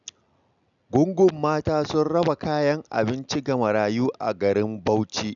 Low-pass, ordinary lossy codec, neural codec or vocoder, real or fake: 7.2 kHz; none; none; real